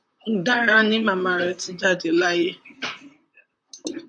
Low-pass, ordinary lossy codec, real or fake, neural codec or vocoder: 9.9 kHz; MP3, 96 kbps; fake; vocoder, 44.1 kHz, 128 mel bands, Pupu-Vocoder